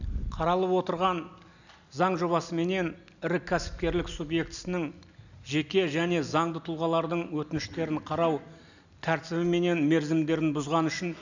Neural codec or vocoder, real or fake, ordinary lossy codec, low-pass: none; real; none; 7.2 kHz